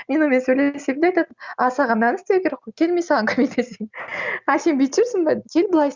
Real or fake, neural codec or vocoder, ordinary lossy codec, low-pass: real; none; Opus, 64 kbps; 7.2 kHz